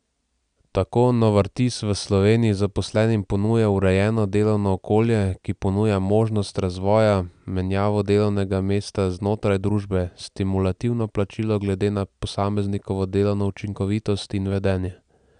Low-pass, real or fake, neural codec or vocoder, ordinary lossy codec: 9.9 kHz; real; none; none